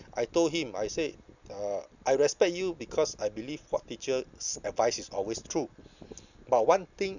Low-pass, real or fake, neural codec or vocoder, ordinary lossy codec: 7.2 kHz; real; none; none